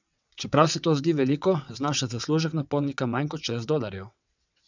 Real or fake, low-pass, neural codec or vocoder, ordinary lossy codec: fake; 7.2 kHz; vocoder, 22.05 kHz, 80 mel bands, WaveNeXt; none